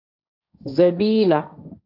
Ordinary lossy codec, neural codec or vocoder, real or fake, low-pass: AAC, 48 kbps; codec, 16 kHz, 1.1 kbps, Voila-Tokenizer; fake; 5.4 kHz